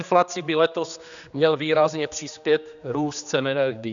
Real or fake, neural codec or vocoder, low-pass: fake; codec, 16 kHz, 2 kbps, X-Codec, HuBERT features, trained on general audio; 7.2 kHz